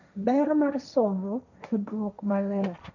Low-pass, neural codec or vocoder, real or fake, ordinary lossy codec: 7.2 kHz; codec, 16 kHz, 1.1 kbps, Voila-Tokenizer; fake; none